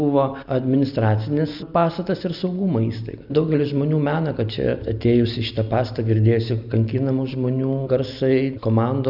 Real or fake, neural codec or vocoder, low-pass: real; none; 5.4 kHz